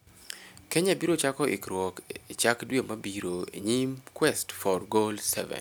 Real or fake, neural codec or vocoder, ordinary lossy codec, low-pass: real; none; none; none